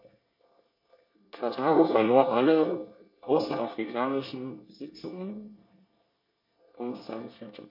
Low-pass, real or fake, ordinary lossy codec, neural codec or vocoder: 5.4 kHz; fake; MP3, 32 kbps; codec, 24 kHz, 1 kbps, SNAC